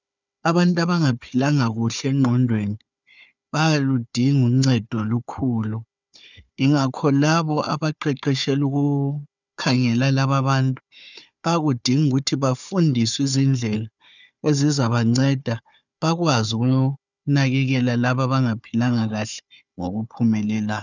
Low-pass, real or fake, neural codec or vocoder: 7.2 kHz; fake; codec, 16 kHz, 4 kbps, FunCodec, trained on Chinese and English, 50 frames a second